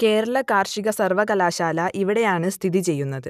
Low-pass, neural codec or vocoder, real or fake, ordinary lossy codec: 14.4 kHz; none; real; none